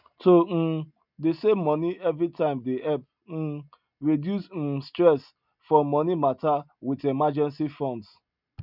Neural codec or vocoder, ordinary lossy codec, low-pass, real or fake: none; none; 5.4 kHz; real